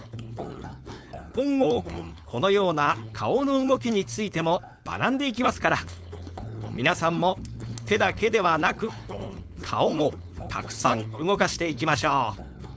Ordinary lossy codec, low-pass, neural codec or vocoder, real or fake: none; none; codec, 16 kHz, 4.8 kbps, FACodec; fake